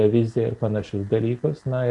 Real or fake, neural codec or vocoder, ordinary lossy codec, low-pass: real; none; MP3, 48 kbps; 10.8 kHz